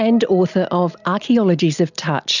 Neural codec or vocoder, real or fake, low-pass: none; real; 7.2 kHz